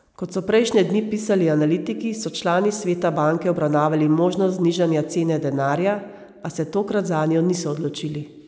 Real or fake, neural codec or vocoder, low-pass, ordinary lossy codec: real; none; none; none